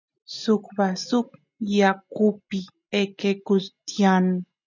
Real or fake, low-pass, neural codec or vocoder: real; 7.2 kHz; none